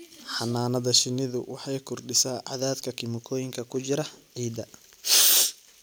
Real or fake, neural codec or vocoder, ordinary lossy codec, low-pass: real; none; none; none